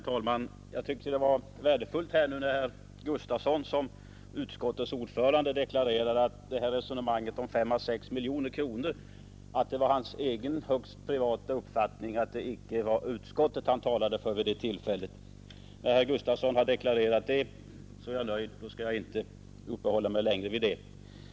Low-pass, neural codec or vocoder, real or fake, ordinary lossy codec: none; none; real; none